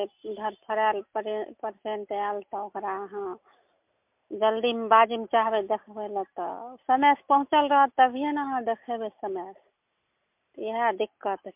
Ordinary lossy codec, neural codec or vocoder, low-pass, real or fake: MP3, 32 kbps; none; 3.6 kHz; real